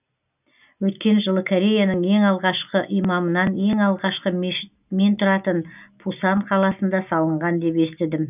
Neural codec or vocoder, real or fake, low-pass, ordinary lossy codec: none; real; 3.6 kHz; none